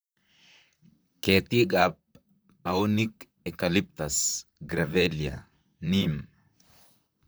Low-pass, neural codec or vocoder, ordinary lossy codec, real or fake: none; vocoder, 44.1 kHz, 128 mel bands, Pupu-Vocoder; none; fake